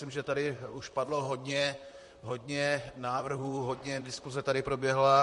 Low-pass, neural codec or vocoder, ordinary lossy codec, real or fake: 14.4 kHz; vocoder, 44.1 kHz, 128 mel bands, Pupu-Vocoder; MP3, 48 kbps; fake